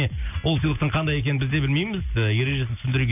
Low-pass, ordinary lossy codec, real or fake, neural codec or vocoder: 3.6 kHz; none; real; none